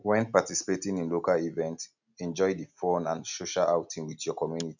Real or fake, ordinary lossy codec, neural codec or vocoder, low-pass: real; none; none; 7.2 kHz